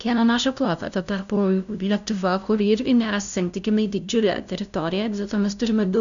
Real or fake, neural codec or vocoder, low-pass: fake; codec, 16 kHz, 0.5 kbps, FunCodec, trained on LibriTTS, 25 frames a second; 7.2 kHz